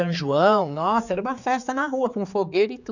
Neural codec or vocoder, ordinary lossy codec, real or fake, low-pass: codec, 16 kHz, 4 kbps, X-Codec, HuBERT features, trained on general audio; none; fake; 7.2 kHz